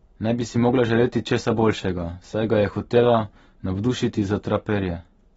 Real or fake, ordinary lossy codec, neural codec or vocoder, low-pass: real; AAC, 24 kbps; none; 10.8 kHz